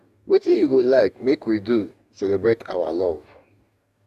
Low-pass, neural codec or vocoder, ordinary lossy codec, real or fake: 14.4 kHz; codec, 44.1 kHz, 2.6 kbps, DAC; none; fake